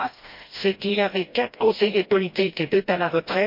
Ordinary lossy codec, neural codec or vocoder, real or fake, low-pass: MP3, 32 kbps; codec, 16 kHz, 1 kbps, FreqCodec, smaller model; fake; 5.4 kHz